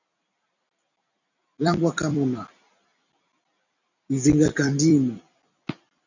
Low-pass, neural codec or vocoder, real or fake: 7.2 kHz; vocoder, 44.1 kHz, 128 mel bands every 256 samples, BigVGAN v2; fake